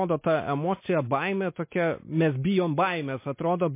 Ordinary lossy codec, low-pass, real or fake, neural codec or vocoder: MP3, 24 kbps; 3.6 kHz; real; none